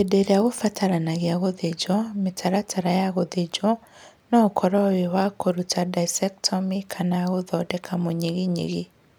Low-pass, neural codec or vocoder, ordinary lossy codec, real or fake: none; none; none; real